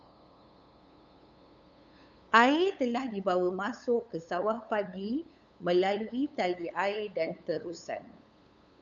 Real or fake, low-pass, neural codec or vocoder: fake; 7.2 kHz; codec, 16 kHz, 8 kbps, FunCodec, trained on LibriTTS, 25 frames a second